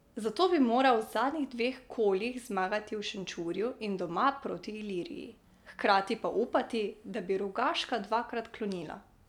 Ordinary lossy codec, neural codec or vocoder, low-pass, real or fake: none; none; 19.8 kHz; real